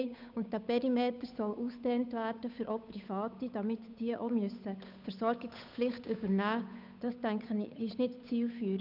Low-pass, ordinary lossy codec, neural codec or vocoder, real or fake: 5.4 kHz; none; codec, 16 kHz, 8 kbps, FunCodec, trained on Chinese and English, 25 frames a second; fake